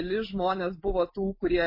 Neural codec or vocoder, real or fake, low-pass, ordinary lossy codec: none; real; 5.4 kHz; MP3, 24 kbps